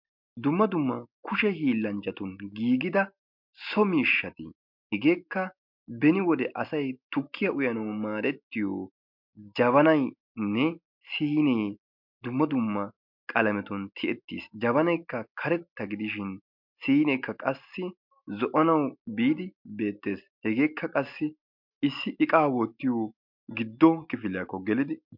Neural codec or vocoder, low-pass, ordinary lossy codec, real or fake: none; 5.4 kHz; MP3, 48 kbps; real